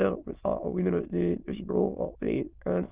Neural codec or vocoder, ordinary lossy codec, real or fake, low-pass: autoencoder, 22.05 kHz, a latent of 192 numbers a frame, VITS, trained on many speakers; Opus, 24 kbps; fake; 3.6 kHz